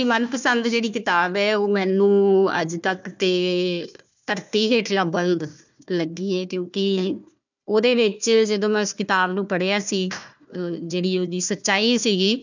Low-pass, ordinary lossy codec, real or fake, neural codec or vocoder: 7.2 kHz; none; fake; codec, 16 kHz, 1 kbps, FunCodec, trained on Chinese and English, 50 frames a second